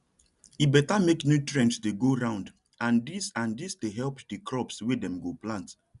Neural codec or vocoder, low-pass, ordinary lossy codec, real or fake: none; 10.8 kHz; none; real